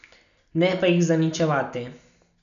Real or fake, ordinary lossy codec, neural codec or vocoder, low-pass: real; none; none; 7.2 kHz